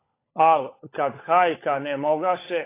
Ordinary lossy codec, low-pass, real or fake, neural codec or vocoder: AAC, 24 kbps; 3.6 kHz; fake; codec, 16 kHz, 16 kbps, FunCodec, trained on LibriTTS, 50 frames a second